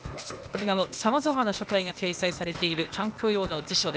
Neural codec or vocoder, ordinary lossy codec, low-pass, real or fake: codec, 16 kHz, 0.8 kbps, ZipCodec; none; none; fake